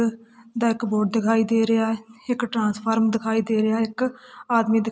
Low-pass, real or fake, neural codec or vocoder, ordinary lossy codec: none; real; none; none